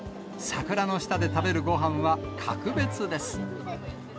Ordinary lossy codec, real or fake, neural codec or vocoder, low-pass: none; real; none; none